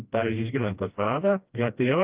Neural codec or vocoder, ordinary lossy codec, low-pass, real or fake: codec, 16 kHz, 1 kbps, FreqCodec, smaller model; Opus, 32 kbps; 3.6 kHz; fake